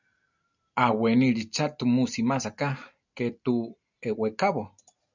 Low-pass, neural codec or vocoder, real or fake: 7.2 kHz; none; real